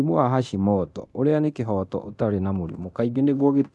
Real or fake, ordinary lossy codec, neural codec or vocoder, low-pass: fake; none; codec, 24 kHz, 0.9 kbps, DualCodec; none